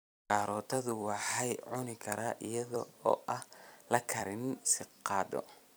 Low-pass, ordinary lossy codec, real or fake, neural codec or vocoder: none; none; fake; vocoder, 44.1 kHz, 128 mel bands every 256 samples, BigVGAN v2